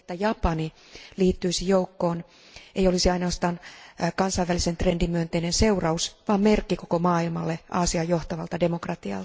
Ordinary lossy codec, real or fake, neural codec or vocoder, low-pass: none; real; none; none